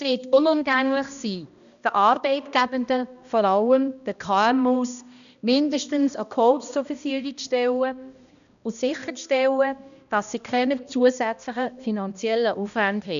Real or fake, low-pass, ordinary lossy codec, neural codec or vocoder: fake; 7.2 kHz; none; codec, 16 kHz, 1 kbps, X-Codec, HuBERT features, trained on balanced general audio